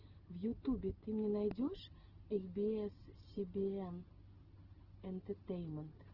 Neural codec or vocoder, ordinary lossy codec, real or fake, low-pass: none; Opus, 16 kbps; real; 5.4 kHz